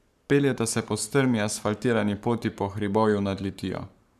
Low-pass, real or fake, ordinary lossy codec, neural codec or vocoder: 14.4 kHz; fake; none; codec, 44.1 kHz, 7.8 kbps, Pupu-Codec